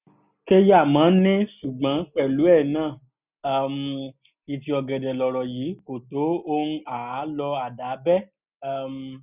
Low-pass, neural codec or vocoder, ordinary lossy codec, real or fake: 3.6 kHz; none; none; real